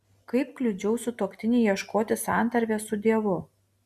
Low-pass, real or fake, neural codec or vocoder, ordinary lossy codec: 14.4 kHz; real; none; Opus, 64 kbps